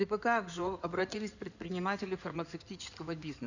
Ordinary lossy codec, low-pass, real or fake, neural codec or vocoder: none; 7.2 kHz; fake; codec, 16 kHz in and 24 kHz out, 2.2 kbps, FireRedTTS-2 codec